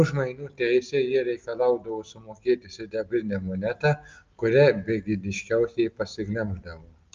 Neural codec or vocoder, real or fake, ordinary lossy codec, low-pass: none; real; Opus, 24 kbps; 7.2 kHz